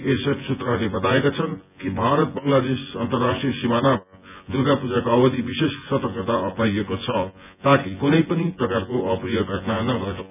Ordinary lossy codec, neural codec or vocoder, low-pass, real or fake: none; vocoder, 24 kHz, 100 mel bands, Vocos; 3.6 kHz; fake